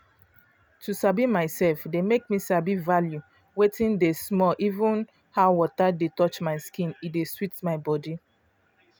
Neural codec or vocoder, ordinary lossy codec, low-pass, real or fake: none; none; none; real